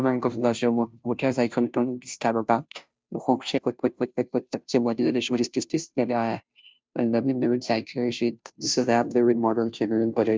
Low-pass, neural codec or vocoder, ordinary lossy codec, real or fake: none; codec, 16 kHz, 0.5 kbps, FunCodec, trained on Chinese and English, 25 frames a second; none; fake